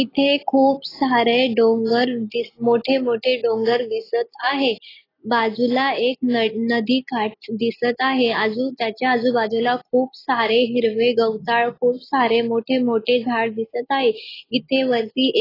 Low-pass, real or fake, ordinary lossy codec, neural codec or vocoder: 5.4 kHz; real; AAC, 24 kbps; none